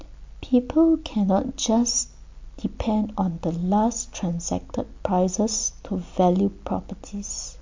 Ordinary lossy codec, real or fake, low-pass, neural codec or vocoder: MP3, 48 kbps; real; 7.2 kHz; none